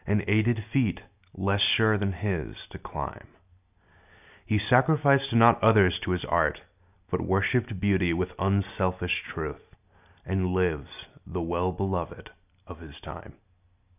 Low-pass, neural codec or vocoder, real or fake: 3.6 kHz; none; real